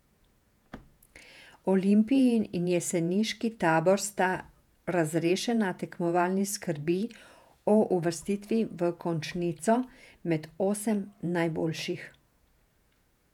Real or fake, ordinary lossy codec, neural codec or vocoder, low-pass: fake; none; vocoder, 48 kHz, 128 mel bands, Vocos; 19.8 kHz